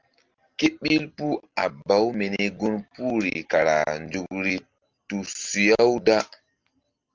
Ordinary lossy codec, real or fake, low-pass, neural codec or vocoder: Opus, 32 kbps; real; 7.2 kHz; none